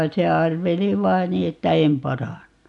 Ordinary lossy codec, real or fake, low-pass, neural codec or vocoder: none; real; 10.8 kHz; none